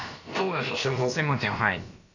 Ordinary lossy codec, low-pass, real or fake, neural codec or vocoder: none; 7.2 kHz; fake; codec, 16 kHz, about 1 kbps, DyCAST, with the encoder's durations